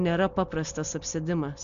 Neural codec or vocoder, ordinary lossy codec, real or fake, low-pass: none; MP3, 64 kbps; real; 7.2 kHz